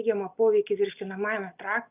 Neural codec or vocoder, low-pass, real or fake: none; 3.6 kHz; real